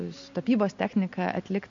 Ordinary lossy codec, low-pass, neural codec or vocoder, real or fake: MP3, 48 kbps; 7.2 kHz; none; real